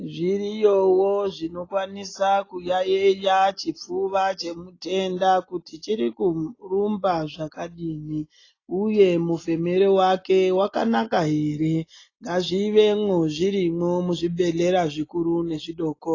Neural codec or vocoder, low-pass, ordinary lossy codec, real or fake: none; 7.2 kHz; AAC, 32 kbps; real